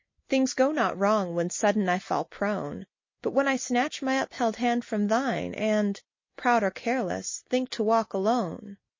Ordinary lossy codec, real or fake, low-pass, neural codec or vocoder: MP3, 32 kbps; real; 7.2 kHz; none